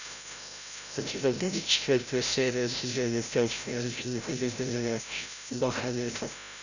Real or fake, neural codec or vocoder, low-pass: fake; codec, 16 kHz, 0.5 kbps, FreqCodec, larger model; 7.2 kHz